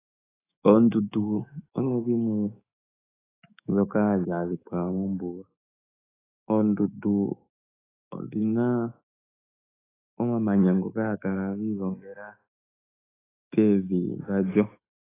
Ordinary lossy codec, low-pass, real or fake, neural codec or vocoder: AAC, 16 kbps; 3.6 kHz; fake; autoencoder, 48 kHz, 128 numbers a frame, DAC-VAE, trained on Japanese speech